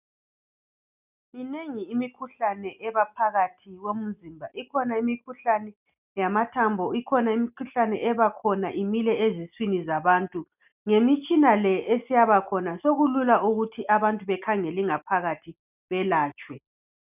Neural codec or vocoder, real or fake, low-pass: none; real; 3.6 kHz